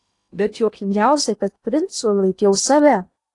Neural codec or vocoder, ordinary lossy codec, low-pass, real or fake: codec, 16 kHz in and 24 kHz out, 0.8 kbps, FocalCodec, streaming, 65536 codes; AAC, 48 kbps; 10.8 kHz; fake